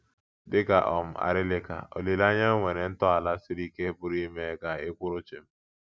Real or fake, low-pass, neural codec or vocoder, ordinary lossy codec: real; none; none; none